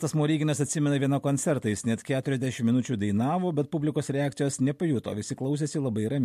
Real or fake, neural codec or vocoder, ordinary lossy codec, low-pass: real; none; MP3, 64 kbps; 14.4 kHz